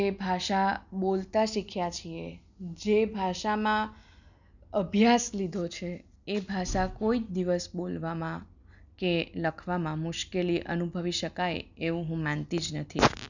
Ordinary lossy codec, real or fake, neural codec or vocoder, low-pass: none; real; none; 7.2 kHz